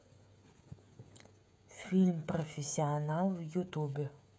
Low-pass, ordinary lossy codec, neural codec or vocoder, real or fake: none; none; codec, 16 kHz, 16 kbps, FreqCodec, smaller model; fake